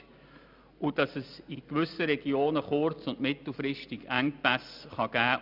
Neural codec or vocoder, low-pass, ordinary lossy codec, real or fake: none; 5.4 kHz; none; real